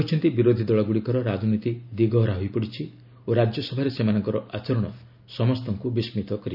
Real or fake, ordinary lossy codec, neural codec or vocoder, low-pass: real; none; none; 5.4 kHz